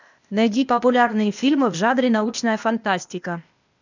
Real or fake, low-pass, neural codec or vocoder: fake; 7.2 kHz; codec, 16 kHz, 0.8 kbps, ZipCodec